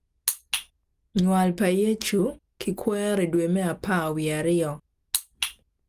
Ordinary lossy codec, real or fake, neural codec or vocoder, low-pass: Opus, 32 kbps; real; none; 14.4 kHz